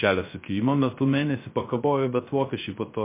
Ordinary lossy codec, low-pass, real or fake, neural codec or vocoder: MP3, 24 kbps; 3.6 kHz; fake; codec, 16 kHz, 0.3 kbps, FocalCodec